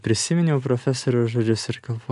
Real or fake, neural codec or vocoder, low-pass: real; none; 10.8 kHz